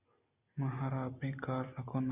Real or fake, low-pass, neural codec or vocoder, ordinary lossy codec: real; 3.6 kHz; none; Opus, 64 kbps